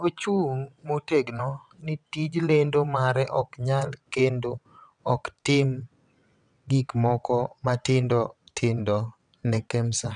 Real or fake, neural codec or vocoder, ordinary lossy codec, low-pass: fake; vocoder, 22.05 kHz, 80 mel bands, WaveNeXt; none; 9.9 kHz